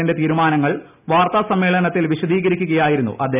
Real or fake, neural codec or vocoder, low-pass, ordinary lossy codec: real; none; 3.6 kHz; none